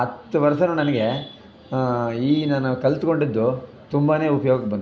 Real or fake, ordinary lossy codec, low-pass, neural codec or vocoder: real; none; none; none